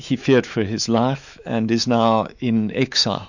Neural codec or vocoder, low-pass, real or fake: autoencoder, 48 kHz, 128 numbers a frame, DAC-VAE, trained on Japanese speech; 7.2 kHz; fake